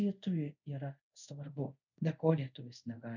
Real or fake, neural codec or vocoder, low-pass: fake; codec, 24 kHz, 0.5 kbps, DualCodec; 7.2 kHz